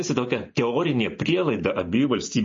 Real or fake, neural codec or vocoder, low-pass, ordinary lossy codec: real; none; 7.2 kHz; MP3, 32 kbps